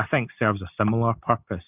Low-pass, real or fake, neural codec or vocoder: 3.6 kHz; real; none